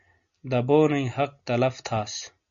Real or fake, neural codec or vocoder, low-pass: real; none; 7.2 kHz